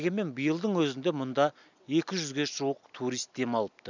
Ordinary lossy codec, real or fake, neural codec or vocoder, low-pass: none; real; none; 7.2 kHz